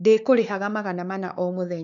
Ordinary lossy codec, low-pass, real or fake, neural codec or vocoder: none; 7.2 kHz; fake; codec, 16 kHz, 4 kbps, X-Codec, WavLM features, trained on Multilingual LibriSpeech